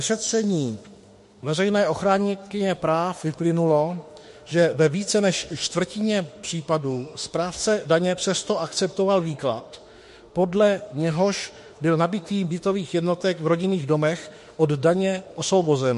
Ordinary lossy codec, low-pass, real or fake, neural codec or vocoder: MP3, 48 kbps; 14.4 kHz; fake; autoencoder, 48 kHz, 32 numbers a frame, DAC-VAE, trained on Japanese speech